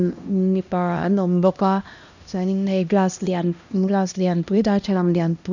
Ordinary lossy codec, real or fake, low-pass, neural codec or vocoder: none; fake; 7.2 kHz; codec, 16 kHz, 1 kbps, X-Codec, HuBERT features, trained on LibriSpeech